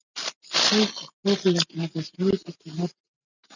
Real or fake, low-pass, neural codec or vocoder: real; 7.2 kHz; none